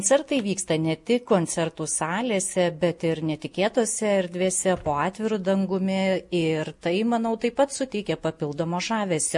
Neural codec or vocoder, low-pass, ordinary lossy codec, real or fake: none; 10.8 kHz; MP3, 48 kbps; real